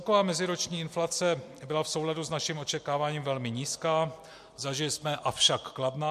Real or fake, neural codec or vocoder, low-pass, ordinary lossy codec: real; none; 14.4 kHz; MP3, 64 kbps